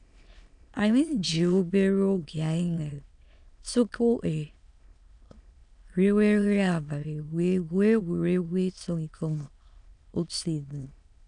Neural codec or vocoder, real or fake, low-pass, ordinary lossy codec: autoencoder, 22.05 kHz, a latent of 192 numbers a frame, VITS, trained on many speakers; fake; 9.9 kHz; none